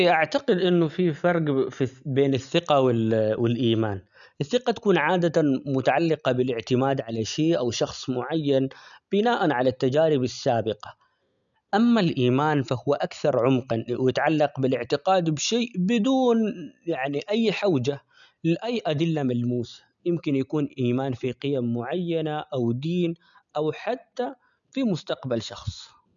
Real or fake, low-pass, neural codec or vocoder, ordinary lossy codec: real; 7.2 kHz; none; none